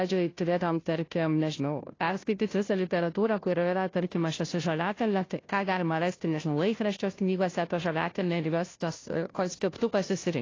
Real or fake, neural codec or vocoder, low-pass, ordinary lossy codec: fake; codec, 16 kHz, 0.5 kbps, FunCodec, trained on Chinese and English, 25 frames a second; 7.2 kHz; AAC, 32 kbps